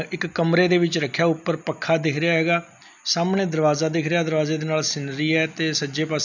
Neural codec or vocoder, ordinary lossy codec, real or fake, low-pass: none; none; real; 7.2 kHz